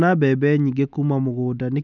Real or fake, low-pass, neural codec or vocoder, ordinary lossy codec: real; 7.2 kHz; none; none